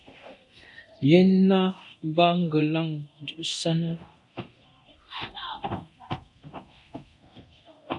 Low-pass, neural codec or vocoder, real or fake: 10.8 kHz; codec, 24 kHz, 0.9 kbps, DualCodec; fake